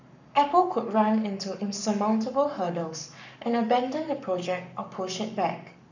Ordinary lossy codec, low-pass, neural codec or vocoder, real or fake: none; 7.2 kHz; codec, 44.1 kHz, 7.8 kbps, Pupu-Codec; fake